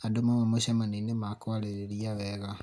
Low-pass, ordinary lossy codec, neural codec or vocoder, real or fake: none; none; none; real